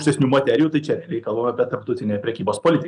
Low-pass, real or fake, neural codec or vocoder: 10.8 kHz; real; none